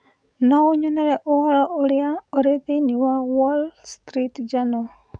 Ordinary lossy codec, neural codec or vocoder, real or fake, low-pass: none; codec, 44.1 kHz, 7.8 kbps, DAC; fake; 9.9 kHz